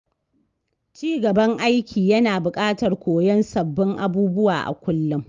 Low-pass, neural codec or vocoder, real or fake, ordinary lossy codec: 7.2 kHz; none; real; Opus, 32 kbps